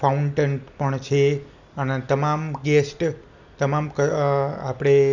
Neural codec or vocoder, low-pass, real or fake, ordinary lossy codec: none; 7.2 kHz; real; none